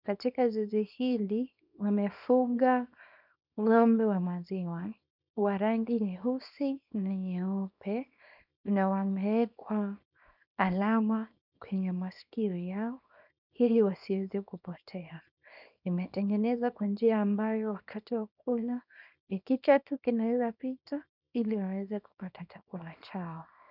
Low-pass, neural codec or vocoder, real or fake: 5.4 kHz; codec, 24 kHz, 0.9 kbps, WavTokenizer, small release; fake